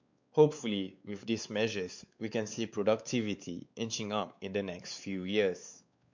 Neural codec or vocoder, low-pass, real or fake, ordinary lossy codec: codec, 16 kHz, 4 kbps, X-Codec, WavLM features, trained on Multilingual LibriSpeech; 7.2 kHz; fake; MP3, 64 kbps